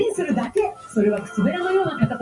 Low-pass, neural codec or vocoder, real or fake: 10.8 kHz; none; real